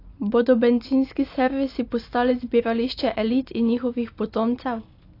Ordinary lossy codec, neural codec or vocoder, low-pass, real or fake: AAC, 32 kbps; none; 5.4 kHz; real